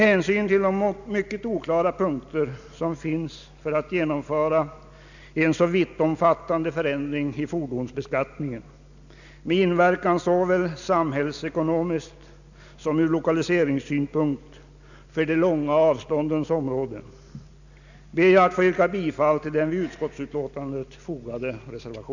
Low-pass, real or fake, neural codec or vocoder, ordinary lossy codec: 7.2 kHz; real; none; none